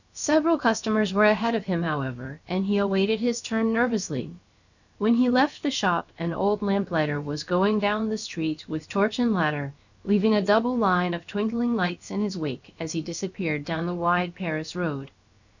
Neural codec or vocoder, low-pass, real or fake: codec, 16 kHz, about 1 kbps, DyCAST, with the encoder's durations; 7.2 kHz; fake